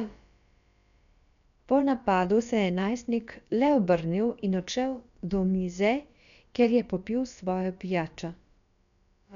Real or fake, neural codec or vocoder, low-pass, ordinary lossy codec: fake; codec, 16 kHz, about 1 kbps, DyCAST, with the encoder's durations; 7.2 kHz; none